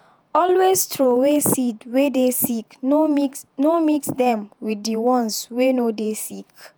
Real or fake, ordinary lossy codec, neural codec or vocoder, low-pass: fake; none; vocoder, 48 kHz, 128 mel bands, Vocos; none